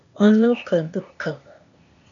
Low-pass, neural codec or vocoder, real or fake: 7.2 kHz; codec, 16 kHz, 0.8 kbps, ZipCodec; fake